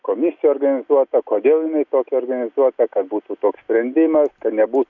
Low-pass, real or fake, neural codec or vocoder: 7.2 kHz; real; none